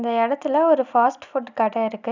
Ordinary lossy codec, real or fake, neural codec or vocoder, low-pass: none; real; none; 7.2 kHz